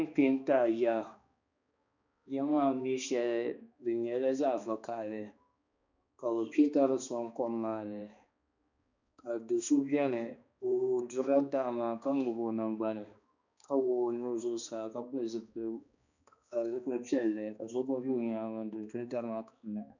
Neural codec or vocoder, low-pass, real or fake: codec, 16 kHz, 2 kbps, X-Codec, HuBERT features, trained on balanced general audio; 7.2 kHz; fake